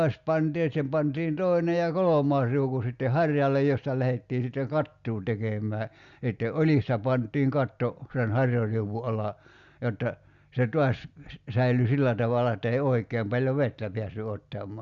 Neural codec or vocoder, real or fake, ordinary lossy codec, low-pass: none; real; Opus, 64 kbps; 7.2 kHz